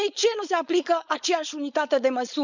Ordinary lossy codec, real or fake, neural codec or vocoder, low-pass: none; fake; codec, 16 kHz, 4.8 kbps, FACodec; 7.2 kHz